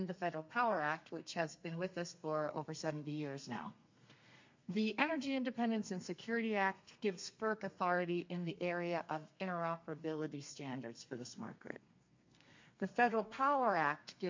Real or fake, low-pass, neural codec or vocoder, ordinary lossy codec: fake; 7.2 kHz; codec, 32 kHz, 1.9 kbps, SNAC; MP3, 48 kbps